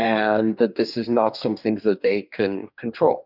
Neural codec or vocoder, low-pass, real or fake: codec, 44.1 kHz, 2.6 kbps, DAC; 5.4 kHz; fake